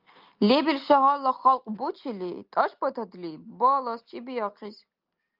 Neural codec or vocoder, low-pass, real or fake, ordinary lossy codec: none; 5.4 kHz; real; Opus, 24 kbps